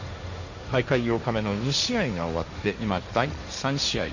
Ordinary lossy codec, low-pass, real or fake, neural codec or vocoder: none; 7.2 kHz; fake; codec, 16 kHz, 1.1 kbps, Voila-Tokenizer